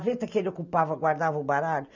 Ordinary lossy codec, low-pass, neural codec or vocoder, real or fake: none; 7.2 kHz; none; real